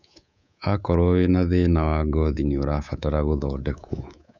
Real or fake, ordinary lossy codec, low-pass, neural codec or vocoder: fake; none; 7.2 kHz; codec, 24 kHz, 3.1 kbps, DualCodec